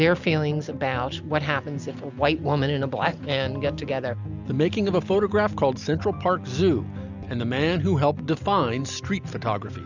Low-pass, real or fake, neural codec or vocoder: 7.2 kHz; real; none